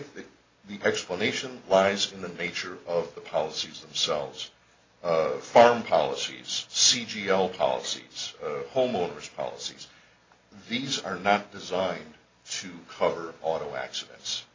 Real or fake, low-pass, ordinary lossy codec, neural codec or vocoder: real; 7.2 kHz; AAC, 32 kbps; none